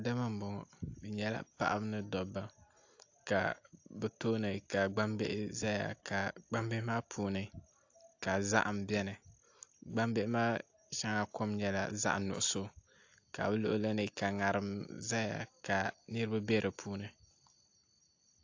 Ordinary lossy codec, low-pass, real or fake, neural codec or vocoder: AAC, 48 kbps; 7.2 kHz; real; none